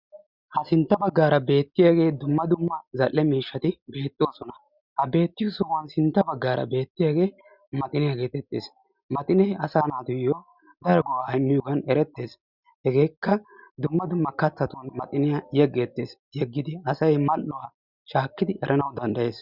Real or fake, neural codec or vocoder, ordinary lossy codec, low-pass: real; none; Opus, 64 kbps; 5.4 kHz